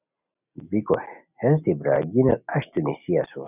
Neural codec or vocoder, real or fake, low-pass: none; real; 3.6 kHz